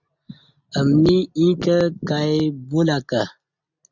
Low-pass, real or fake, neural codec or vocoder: 7.2 kHz; real; none